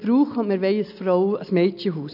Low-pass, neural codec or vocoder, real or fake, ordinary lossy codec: 5.4 kHz; none; real; none